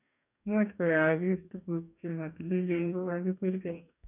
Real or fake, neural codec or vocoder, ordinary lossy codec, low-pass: fake; codec, 44.1 kHz, 2.6 kbps, DAC; none; 3.6 kHz